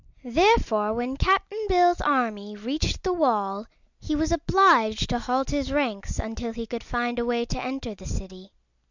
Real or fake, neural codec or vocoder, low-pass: real; none; 7.2 kHz